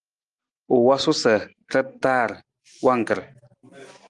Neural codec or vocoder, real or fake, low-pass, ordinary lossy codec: none; real; 10.8 kHz; Opus, 24 kbps